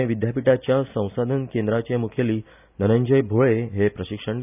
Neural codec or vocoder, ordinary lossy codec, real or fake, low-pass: none; none; real; 3.6 kHz